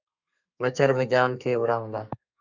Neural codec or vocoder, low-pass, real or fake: codec, 32 kHz, 1.9 kbps, SNAC; 7.2 kHz; fake